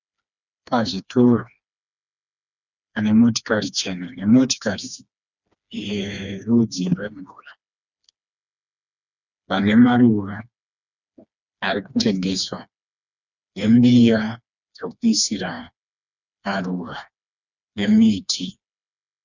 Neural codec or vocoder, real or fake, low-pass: codec, 16 kHz, 2 kbps, FreqCodec, smaller model; fake; 7.2 kHz